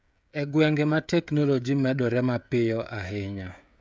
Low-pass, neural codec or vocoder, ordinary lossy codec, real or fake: none; codec, 16 kHz, 16 kbps, FreqCodec, smaller model; none; fake